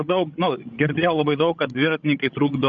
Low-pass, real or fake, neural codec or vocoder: 7.2 kHz; fake; codec, 16 kHz, 16 kbps, FreqCodec, larger model